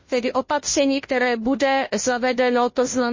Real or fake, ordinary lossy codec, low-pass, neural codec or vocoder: fake; MP3, 32 kbps; 7.2 kHz; codec, 16 kHz, 0.5 kbps, FunCodec, trained on Chinese and English, 25 frames a second